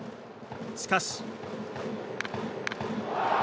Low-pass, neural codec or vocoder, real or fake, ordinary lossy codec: none; none; real; none